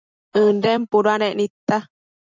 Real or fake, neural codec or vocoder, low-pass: fake; vocoder, 44.1 kHz, 128 mel bands every 256 samples, BigVGAN v2; 7.2 kHz